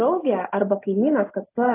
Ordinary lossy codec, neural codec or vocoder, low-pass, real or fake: AAC, 24 kbps; none; 3.6 kHz; real